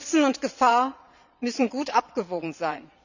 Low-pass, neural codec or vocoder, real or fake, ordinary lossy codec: 7.2 kHz; vocoder, 22.05 kHz, 80 mel bands, Vocos; fake; none